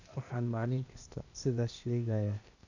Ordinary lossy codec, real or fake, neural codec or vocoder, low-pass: none; fake; codec, 16 kHz, 0.8 kbps, ZipCodec; 7.2 kHz